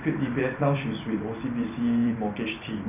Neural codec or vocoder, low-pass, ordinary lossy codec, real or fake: vocoder, 44.1 kHz, 128 mel bands every 256 samples, BigVGAN v2; 3.6 kHz; none; fake